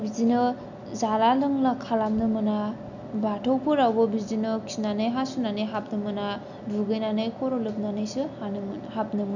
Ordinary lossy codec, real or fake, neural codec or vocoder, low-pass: none; real; none; 7.2 kHz